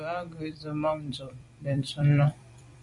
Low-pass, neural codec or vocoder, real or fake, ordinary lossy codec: 10.8 kHz; vocoder, 44.1 kHz, 128 mel bands every 256 samples, BigVGAN v2; fake; MP3, 64 kbps